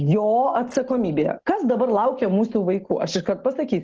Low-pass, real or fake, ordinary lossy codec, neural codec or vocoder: 7.2 kHz; real; Opus, 16 kbps; none